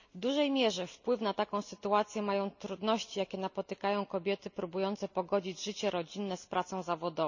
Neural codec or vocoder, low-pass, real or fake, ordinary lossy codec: none; 7.2 kHz; real; none